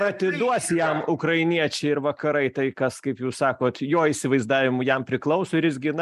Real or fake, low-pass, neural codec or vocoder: real; 14.4 kHz; none